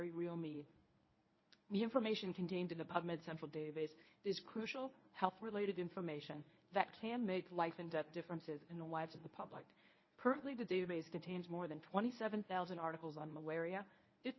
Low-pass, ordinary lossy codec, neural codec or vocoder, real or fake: 7.2 kHz; MP3, 24 kbps; codec, 24 kHz, 0.9 kbps, WavTokenizer, medium speech release version 1; fake